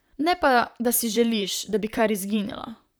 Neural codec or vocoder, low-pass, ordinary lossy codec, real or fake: vocoder, 44.1 kHz, 128 mel bands, Pupu-Vocoder; none; none; fake